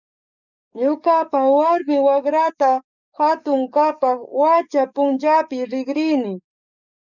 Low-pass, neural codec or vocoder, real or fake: 7.2 kHz; codec, 44.1 kHz, 7.8 kbps, DAC; fake